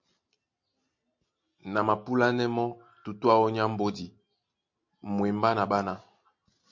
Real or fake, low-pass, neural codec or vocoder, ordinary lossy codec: real; 7.2 kHz; none; MP3, 48 kbps